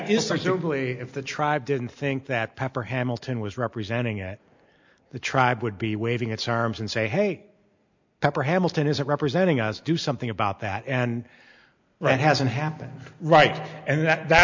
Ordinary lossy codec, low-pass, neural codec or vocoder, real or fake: MP3, 64 kbps; 7.2 kHz; none; real